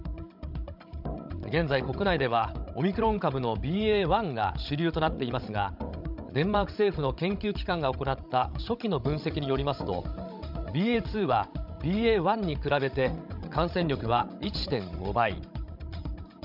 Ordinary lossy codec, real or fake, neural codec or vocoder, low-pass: none; fake; codec, 16 kHz, 16 kbps, FreqCodec, larger model; 5.4 kHz